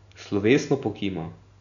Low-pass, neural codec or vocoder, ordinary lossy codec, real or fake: 7.2 kHz; none; none; real